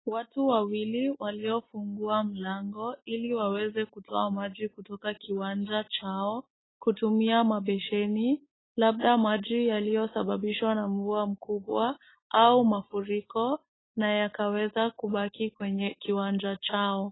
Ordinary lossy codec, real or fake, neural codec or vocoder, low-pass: AAC, 16 kbps; real; none; 7.2 kHz